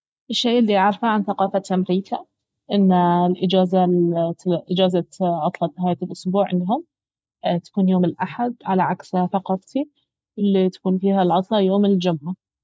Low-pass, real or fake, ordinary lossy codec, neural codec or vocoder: none; real; none; none